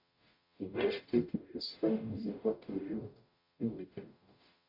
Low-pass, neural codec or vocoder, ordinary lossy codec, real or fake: 5.4 kHz; codec, 44.1 kHz, 0.9 kbps, DAC; MP3, 32 kbps; fake